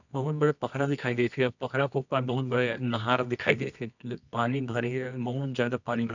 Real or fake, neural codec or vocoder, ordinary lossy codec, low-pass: fake; codec, 24 kHz, 0.9 kbps, WavTokenizer, medium music audio release; none; 7.2 kHz